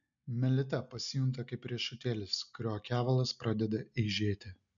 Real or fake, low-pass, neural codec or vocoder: real; 7.2 kHz; none